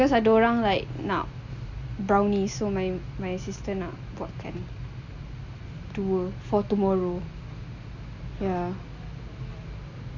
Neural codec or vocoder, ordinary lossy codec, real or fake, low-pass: none; none; real; 7.2 kHz